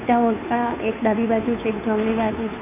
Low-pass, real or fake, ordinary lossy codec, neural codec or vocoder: 3.6 kHz; fake; none; codec, 16 kHz, 2 kbps, FunCodec, trained on Chinese and English, 25 frames a second